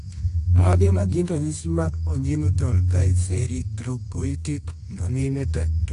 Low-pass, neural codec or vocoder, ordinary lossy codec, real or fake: 10.8 kHz; codec, 24 kHz, 0.9 kbps, WavTokenizer, medium music audio release; AAC, 48 kbps; fake